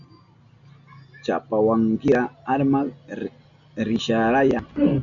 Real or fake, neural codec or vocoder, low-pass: real; none; 7.2 kHz